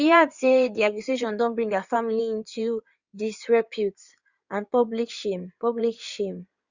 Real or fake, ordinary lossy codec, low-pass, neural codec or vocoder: fake; Opus, 64 kbps; 7.2 kHz; codec, 16 kHz in and 24 kHz out, 2.2 kbps, FireRedTTS-2 codec